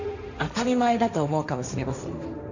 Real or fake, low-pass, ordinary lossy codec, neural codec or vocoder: fake; 7.2 kHz; none; codec, 16 kHz, 1.1 kbps, Voila-Tokenizer